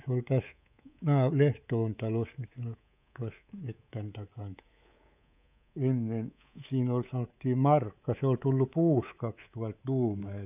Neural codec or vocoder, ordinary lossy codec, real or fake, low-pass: codec, 24 kHz, 3.1 kbps, DualCodec; none; fake; 3.6 kHz